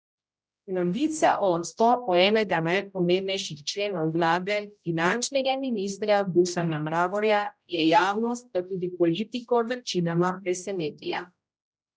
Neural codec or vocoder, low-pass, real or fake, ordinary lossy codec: codec, 16 kHz, 0.5 kbps, X-Codec, HuBERT features, trained on general audio; none; fake; none